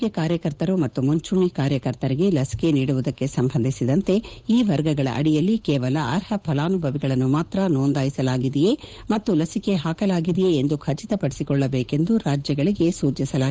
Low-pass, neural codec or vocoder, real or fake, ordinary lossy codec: none; codec, 16 kHz, 8 kbps, FunCodec, trained on Chinese and English, 25 frames a second; fake; none